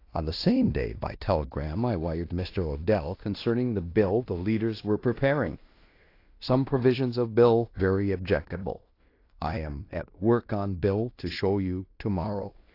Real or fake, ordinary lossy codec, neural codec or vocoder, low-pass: fake; AAC, 32 kbps; codec, 16 kHz in and 24 kHz out, 0.9 kbps, LongCat-Audio-Codec, fine tuned four codebook decoder; 5.4 kHz